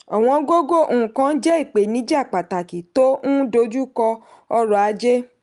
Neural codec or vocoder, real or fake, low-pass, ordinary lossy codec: none; real; 10.8 kHz; Opus, 32 kbps